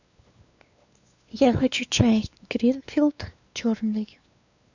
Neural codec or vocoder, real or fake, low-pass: codec, 16 kHz, 2 kbps, X-Codec, WavLM features, trained on Multilingual LibriSpeech; fake; 7.2 kHz